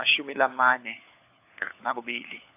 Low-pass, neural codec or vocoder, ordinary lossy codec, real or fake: 3.6 kHz; codec, 16 kHz, 4 kbps, FunCodec, trained on LibriTTS, 50 frames a second; AAC, 32 kbps; fake